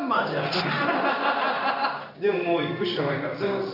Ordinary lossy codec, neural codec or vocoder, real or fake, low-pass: none; codec, 16 kHz in and 24 kHz out, 1 kbps, XY-Tokenizer; fake; 5.4 kHz